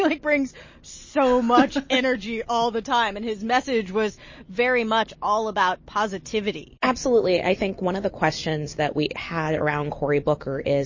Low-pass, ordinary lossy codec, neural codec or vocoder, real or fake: 7.2 kHz; MP3, 32 kbps; none; real